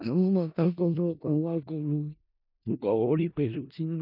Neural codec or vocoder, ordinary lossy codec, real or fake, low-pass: codec, 16 kHz in and 24 kHz out, 0.4 kbps, LongCat-Audio-Codec, four codebook decoder; none; fake; 5.4 kHz